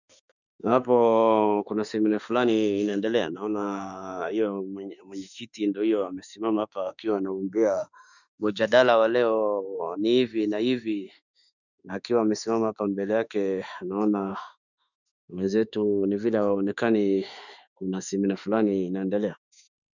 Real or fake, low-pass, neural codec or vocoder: fake; 7.2 kHz; autoencoder, 48 kHz, 32 numbers a frame, DAC-VAE, trained on Japanese speech